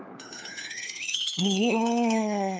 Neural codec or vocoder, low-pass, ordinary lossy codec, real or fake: codec, 16 kHz, 4 kbps, FunCodec, trained on LibriTTS, 50 frames a second; none; none; fake